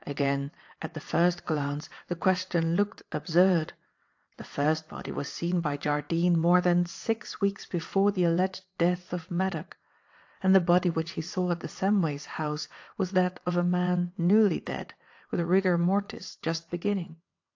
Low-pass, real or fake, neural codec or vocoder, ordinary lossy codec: 7.2 kHz; fake; vocoder, 22.05 kHz, 80 mel bands, WaveNeXt; AAC, 48 kbps